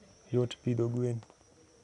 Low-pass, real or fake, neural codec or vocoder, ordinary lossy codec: 10.8 kHz; real; none; none